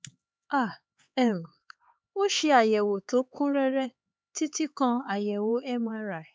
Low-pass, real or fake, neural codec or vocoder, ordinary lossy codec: none; fake; codec, 16 kHz, 4 kbps, X-Codec, HuBERT features, trained on LibriSpeech; none